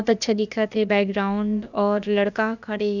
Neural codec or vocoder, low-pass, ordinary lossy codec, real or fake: codec, 16 kHz, about 1 kbps, DyCAST, with the encoder's durations; 7.2 kHz; none; fake